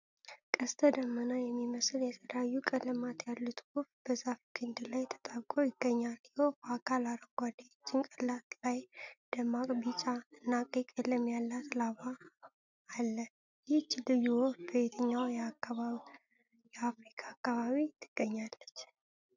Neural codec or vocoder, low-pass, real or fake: none; 7.2 kHz; real